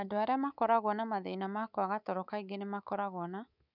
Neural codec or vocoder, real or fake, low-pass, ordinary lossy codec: codec, 24 kHz, 3.1 kbps, DualCodec; fake; 5.4 kHz; none